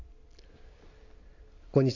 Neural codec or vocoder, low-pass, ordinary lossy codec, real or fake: none; 7.2 kHz; Opus, 64 kbps; real